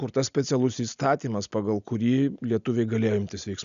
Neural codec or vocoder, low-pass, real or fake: none; 7.2 kHz; real